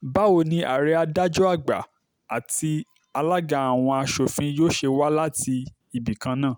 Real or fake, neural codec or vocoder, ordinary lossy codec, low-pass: real; none; none; none